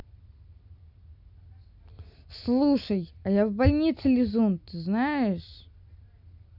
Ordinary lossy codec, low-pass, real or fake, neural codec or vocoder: none; 5.4 kHz; real; none